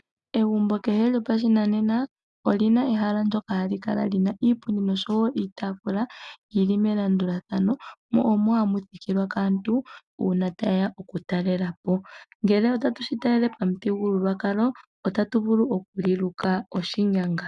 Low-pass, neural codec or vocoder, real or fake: 10.8 kHz; none; real